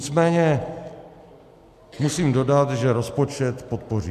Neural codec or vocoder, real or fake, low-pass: none; real; 14.4 kHz